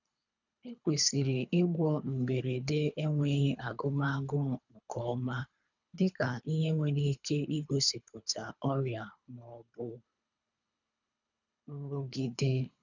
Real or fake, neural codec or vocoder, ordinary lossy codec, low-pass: fake; codec, 24 kHz, 3 kbps, HILCodec; none; 7.2 kHz